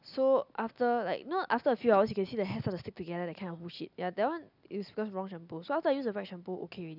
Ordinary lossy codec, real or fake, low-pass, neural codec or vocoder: none; real; 5.4 kHz; none